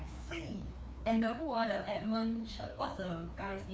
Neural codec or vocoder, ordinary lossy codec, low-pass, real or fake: codec, 16 kHz, 2 kbps, FreqCodec, larger model; none; none; fake